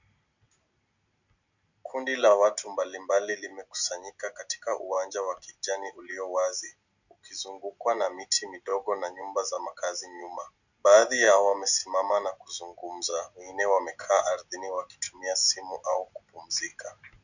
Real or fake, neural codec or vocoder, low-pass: real; none; 7.2 kHz